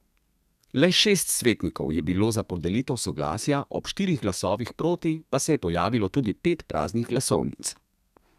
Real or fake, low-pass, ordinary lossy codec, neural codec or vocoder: fake; 14.4 kHz; none; codec, 32 kHz, 1.9 kbps, SNAC